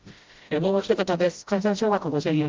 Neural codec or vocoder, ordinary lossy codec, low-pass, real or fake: codec, 16 kHz, 0.5 kbps, FreqCodec, smaller model; Opus, 32 kbps; 7.2 kHz; fake